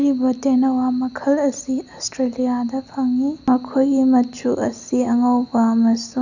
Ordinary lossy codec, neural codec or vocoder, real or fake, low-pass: none; none; real; 7.2 kHz